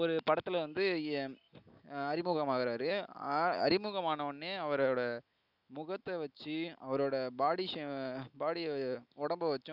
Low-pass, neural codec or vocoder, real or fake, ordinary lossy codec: 5.4 kHz; none; real; none